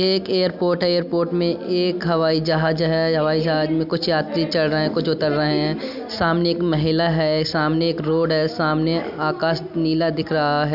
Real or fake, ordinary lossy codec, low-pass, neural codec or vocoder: real; none; 5.4 kHz; none